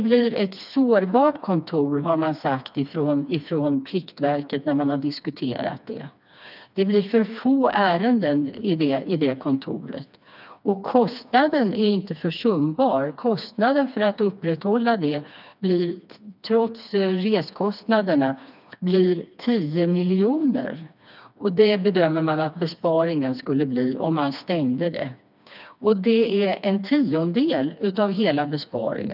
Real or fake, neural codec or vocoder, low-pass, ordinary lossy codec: fake; codec, 16 kHz, 2 kbps, FreqCodec, smaller model; 5.4 kHz; none